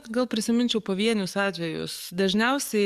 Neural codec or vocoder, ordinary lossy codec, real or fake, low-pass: codec, 44.1 kHz, 7.8 kbps, DAC; Opus, 64 kbps; fake; 14.4 kHz